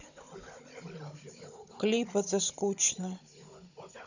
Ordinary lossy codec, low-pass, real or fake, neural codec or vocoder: none; 7.2 kHz; fake; codec, 16 kHz, 16 kbps, FunCodec, trained on Chinese and English, 50 frames a second